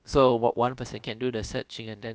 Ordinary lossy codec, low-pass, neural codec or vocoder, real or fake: none; none; codec, 16 kHz, about 1 kbps, DyCAST, with the encoder's durations; fake